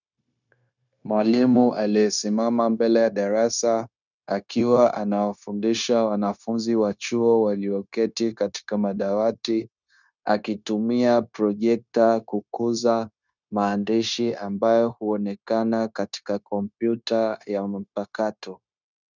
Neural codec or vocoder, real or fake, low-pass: codec, 16 kHz, 0.9 kbps, LongCat-Audio-Codec; fake; 7.2 kHz